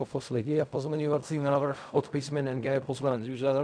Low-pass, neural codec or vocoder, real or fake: 9.9 kHz; codec, 16 kHz in and 24 kHz out, 0.4 kbps, LongCat-Audio-Codec, fine tuned four codebook decoder; fake